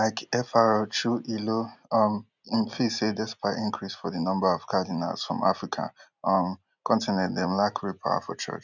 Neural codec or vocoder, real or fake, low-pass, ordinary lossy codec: none; real; 7.2 kHz; none